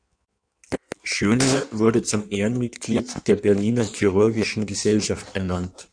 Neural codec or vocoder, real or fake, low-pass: codec, 16 kHz in and 24 kHz out, 1.1 kbps, FireRedTTS-2 codec; fake; 9.9 kHz